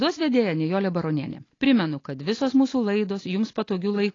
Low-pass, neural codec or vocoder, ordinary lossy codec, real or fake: 7.2 kHz; none; AAC, 32 kbps; real